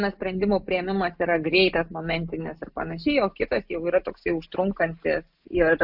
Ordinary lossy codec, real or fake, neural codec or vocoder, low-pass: AAC, 48 kbps; real; none; 5.4 kHz